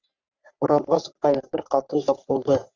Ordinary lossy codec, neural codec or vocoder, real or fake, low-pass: Opus, 64 kbps; codec, 44.1 kHz, 3.4 kbps, Pupu-Codec; fake; 7.2 kHz